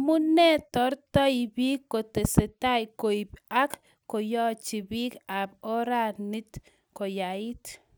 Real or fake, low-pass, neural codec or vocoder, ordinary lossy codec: real; none; none; none